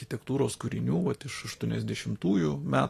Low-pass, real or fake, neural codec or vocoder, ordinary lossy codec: 14.4 kHz; fake; vocoder, 44.1 kHz, 128 mel bands every 256 samples, BigVGAN v2; AAC, 48 kbps